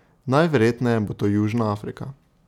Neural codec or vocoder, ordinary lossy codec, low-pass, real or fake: none; none; 19.8 kHz; real